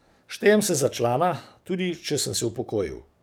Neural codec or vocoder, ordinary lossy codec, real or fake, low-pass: codec, 44.1 kHz, 7.8 kbps, DAC; none; fake; none